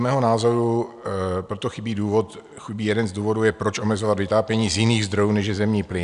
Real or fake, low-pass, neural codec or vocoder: real; 10.8 kHz; none